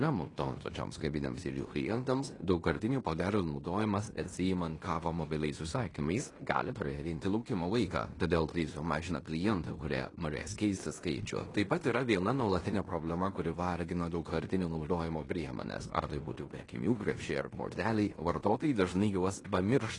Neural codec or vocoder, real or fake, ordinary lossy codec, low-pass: codec, 16 kHz in and 24 kHz out, 0.9 kbps, LongCat-Audio-Codec, fine tuned four codebook decoder; fake; AAC, 32 kbps; 10.8 kHz